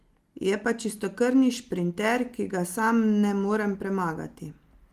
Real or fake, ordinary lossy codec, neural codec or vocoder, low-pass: real; Opus, 24 kbps; none; 14.4 kHz